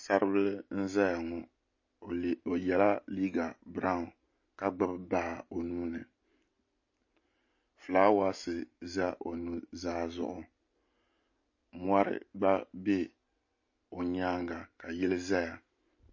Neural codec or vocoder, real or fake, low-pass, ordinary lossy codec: none; real; 7.2 kHz; MP3, 32 kbps